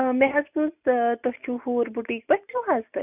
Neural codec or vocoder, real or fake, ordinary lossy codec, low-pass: none; real; none; 3.6 kHz